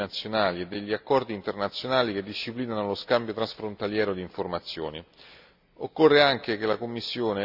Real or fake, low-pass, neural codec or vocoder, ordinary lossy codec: real; 5.4 kHz; none; none